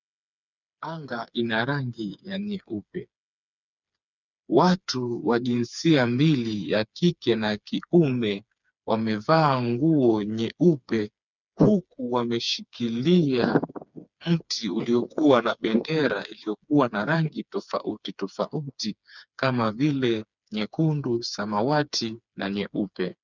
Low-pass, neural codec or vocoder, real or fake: 7.2 kHz; codec, 16 kHz, 4 kbps, FreqCodec, smaller model; fake